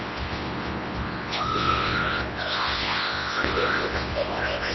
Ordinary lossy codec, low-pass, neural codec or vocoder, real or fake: MP3, 24 kbps; 7.2 kHz; codec, 24 kHz, 0.9 kbps, WavTokenizer, large speech release; fake